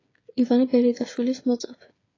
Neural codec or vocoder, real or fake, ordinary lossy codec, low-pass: codec, 16 kHz, 8 kbps, FreqCodec, smaller model; fake; AAC, 32 kbps; 7.2 kHz